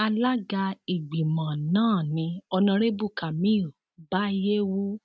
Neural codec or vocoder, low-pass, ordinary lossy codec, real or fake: none; none; none; real